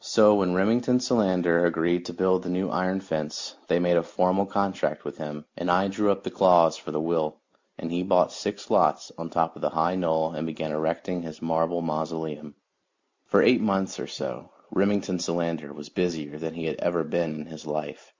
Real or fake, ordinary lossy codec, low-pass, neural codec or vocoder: real; MP3, 64 kbps; 7.2 kHz; none